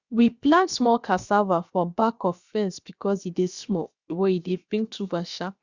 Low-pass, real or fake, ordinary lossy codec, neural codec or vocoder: 7.2 kHz; fake; Opus, 64 kbps; codec, 16 kHz, about 1 kbps, DyCAST, with the encoder's durations